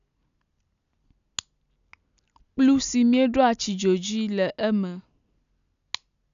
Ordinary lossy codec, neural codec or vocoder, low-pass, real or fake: none; none; 7.2 kHz; real